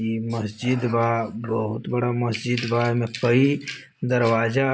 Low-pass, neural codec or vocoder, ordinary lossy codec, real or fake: none; none; none; real